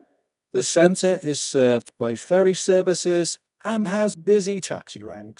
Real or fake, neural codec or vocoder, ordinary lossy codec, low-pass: fake; codec, 24 kHz, 0.9 kbps, WavTokenizer, medium music audio release; none; 10.8 kHz